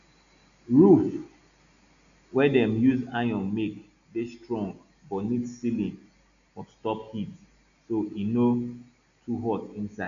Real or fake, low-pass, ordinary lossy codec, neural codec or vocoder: real; 7.2 kHz; none; none